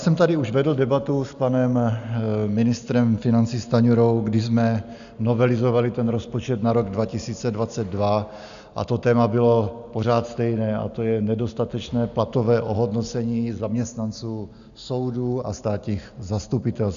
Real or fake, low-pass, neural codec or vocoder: real; 7.2 kHz; none